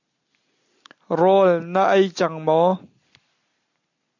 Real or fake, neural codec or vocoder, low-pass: real; none; 7.2 kHz